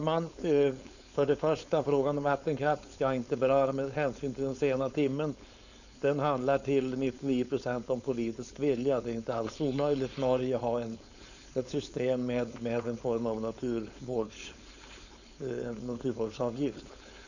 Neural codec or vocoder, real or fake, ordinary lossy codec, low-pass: codec, 16 kHz, 4.8 kbps, FACodec; fake; none; 7.2 kHz